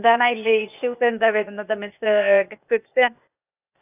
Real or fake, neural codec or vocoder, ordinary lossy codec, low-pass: fake; codec, 16 kHz, 0.8 kbps, ZipCodec; AAC, 32 kbps; 3.6 kHz